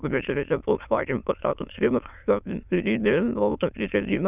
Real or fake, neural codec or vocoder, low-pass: fake; autoencoder, 22.05 kHz, a latent of 192 numbers a frame, VITS, trained on many speakers; 3.6 kHz